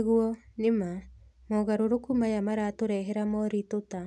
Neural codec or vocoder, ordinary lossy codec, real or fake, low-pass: none; none; real; none